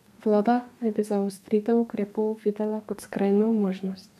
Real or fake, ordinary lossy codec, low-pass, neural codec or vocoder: fake; none; 14.4 kHz; codec, 32 kHz, 1.9 kbps, SNAC